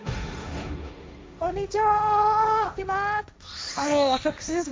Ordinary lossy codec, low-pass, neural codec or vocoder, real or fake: none; none; codec, 16 kHz, 1.1 kbps, Voila-Tokenizer; fake